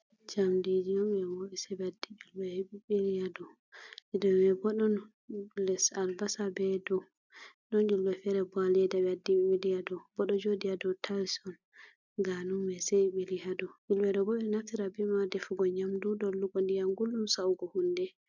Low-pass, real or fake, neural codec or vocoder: 7.2 kHz; real; none